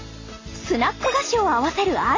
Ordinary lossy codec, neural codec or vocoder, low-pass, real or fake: AAC, 32 kbps; none; 7.2 kHz; real